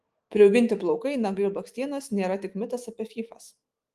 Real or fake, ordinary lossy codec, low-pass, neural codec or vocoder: fake; Opus, 24 kbps; 14.4 kHz; autoencoder, 48 kHz, 128 numbers a frame, DAC-VAE, trained on Japanese speech